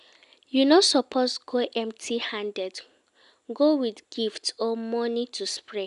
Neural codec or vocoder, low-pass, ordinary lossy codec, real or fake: none; 10.8 kHz; none; real